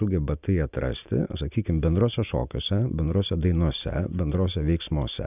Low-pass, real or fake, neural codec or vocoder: 3.6 kHz; real; none